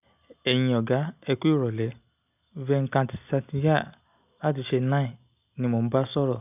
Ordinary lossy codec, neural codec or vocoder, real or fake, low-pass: none; none; real; 3.6 kHz